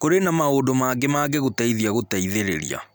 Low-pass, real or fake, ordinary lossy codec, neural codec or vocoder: none; real; none; none